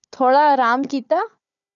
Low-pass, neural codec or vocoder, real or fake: 7.2 kHz; codec, 16 kHz, 4 kbps, FunCodec, trained on Chinese and English, 50 frames a second; fake